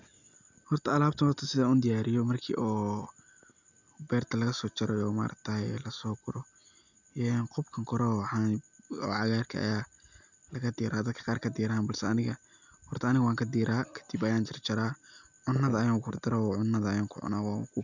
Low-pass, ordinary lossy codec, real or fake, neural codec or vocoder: 7.2 kHz; none; real; none